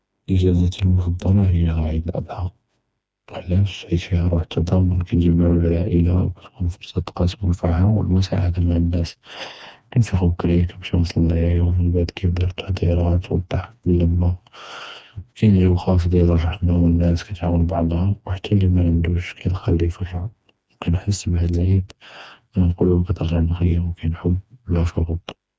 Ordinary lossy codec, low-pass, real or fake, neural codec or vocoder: none; none; fake; codec, 16 kHz, 2 kbps, FreqCodec, smaller model